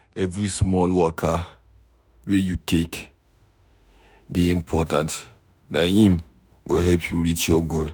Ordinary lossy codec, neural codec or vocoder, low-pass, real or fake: none; autoencoder, 48 kHz, 32 numbers a frame, DAC-VAE, trained on Japanese speech; none; fake